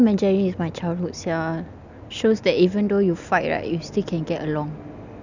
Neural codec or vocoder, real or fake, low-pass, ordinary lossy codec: none; real; 7.2 kHz; none